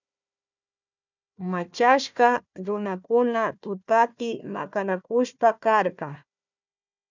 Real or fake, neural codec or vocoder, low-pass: fake; codec, 16 kHz, 1 kbps, FunCodec, trained on Chinese and English, 50 frames a second; 7.2 kHz